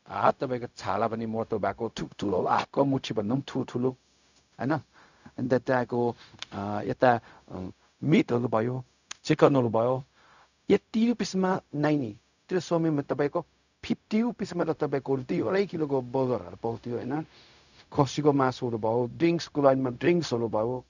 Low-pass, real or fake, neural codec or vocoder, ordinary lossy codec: 7.2 kHz; fake; codec, 16 kHz, 0.4 kbps, LongCat-Audio-Codec; none